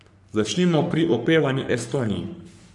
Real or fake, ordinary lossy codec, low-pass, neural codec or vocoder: fake; none; 10.8 kHz; codec, 44.1 kHz, 3.4 kbps, Pupu-Codec